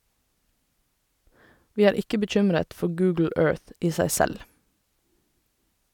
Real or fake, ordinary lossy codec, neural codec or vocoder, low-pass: real; none; none; 19.8 kHz